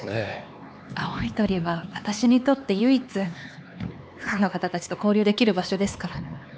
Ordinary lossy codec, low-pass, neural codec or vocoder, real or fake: none; none; codec, 16 kHz, 4 kbps, X-Codec, HuBERT features, trained on LibriSpeech; fake